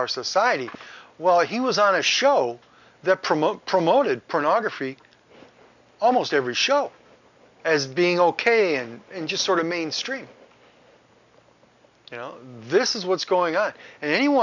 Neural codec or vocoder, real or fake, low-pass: none; real; 7.2 kHz